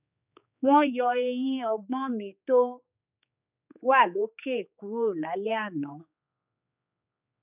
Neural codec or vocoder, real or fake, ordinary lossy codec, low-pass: codec, 16 kHz, 4 kbps, X-Codec, HuBERT features, trained on general audio; fake; none; 3.6 kHz